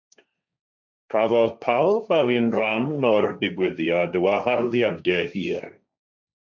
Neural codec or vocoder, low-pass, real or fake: codec, 16 kHz, 1.1 kbps, Voila-Tokenizer; 7.2 kHz; fake